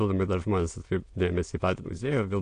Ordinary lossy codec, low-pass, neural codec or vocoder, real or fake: AAC, 48 kbps; 9.9 kHz; autoencoder, 22.05 kHz, a latent of 192 numbers a frame, VITS, trained on many speakers; fake